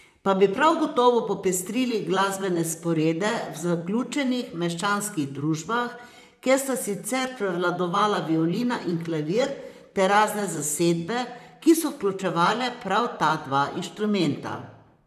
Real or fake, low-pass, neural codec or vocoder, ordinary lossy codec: fake; 14.4 kHz; vocoder, 44.1 kHz, 128 mel bands, Pupu-Vocoder; none